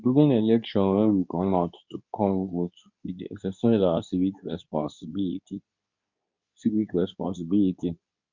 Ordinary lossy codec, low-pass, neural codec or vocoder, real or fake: none; 7.2 kHz; codec, 24 kHz, 0.9 kbps, WavTokenizer, medium speech release version 2; fake